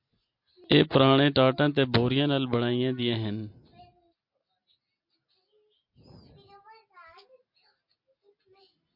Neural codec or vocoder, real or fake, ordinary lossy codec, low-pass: none; real; MP3, 48 kbps; 5.4 kHz